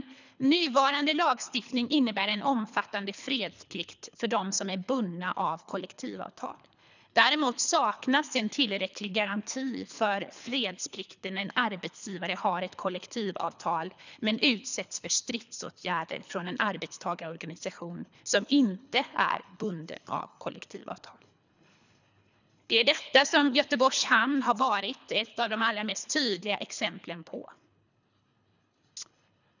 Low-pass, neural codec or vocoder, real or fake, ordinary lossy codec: 7.2 kHz; codec, 24 kHz, 3 kbps, HILCodec; fake; none